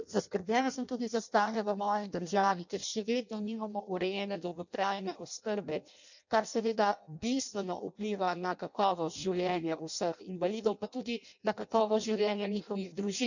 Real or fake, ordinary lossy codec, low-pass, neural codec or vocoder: fake; none; 7.2 kHz; codec, 16 kHz in and 24 kHz out, 0.6 kbps, FireRedTTS-2 codec